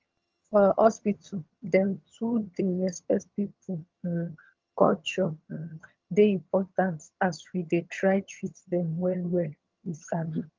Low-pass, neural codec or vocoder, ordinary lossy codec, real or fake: 7.2 kHz; vocoder, 22.05 kHz, 80 mel bands, HiFi-GAN; Opus, 24 kbps; fake